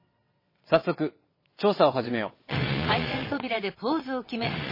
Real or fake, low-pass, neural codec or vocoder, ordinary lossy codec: fake; 5.4 kHz; vocoder, 22.05 kHz, 80 mel bands, WaveNeXt; MP3, 24 kbps